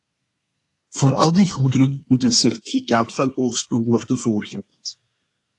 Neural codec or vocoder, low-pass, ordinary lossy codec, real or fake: codec, 24 kHz, 1 kbps, SNAC; 10.8 kHz; AAC, 48 kbps; fake